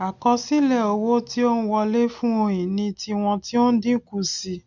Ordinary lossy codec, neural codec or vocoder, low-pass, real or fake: none; none; 7.2 kHz; real